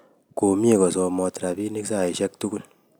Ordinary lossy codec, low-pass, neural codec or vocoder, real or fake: none; none; none; real